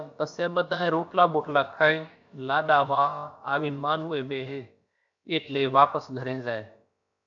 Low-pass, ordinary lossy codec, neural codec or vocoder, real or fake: 7.2 kHz; AAC, 64 kbps; codec, 16 kHz, about 1 kbps, DyCAST, with the encoder's durations; fake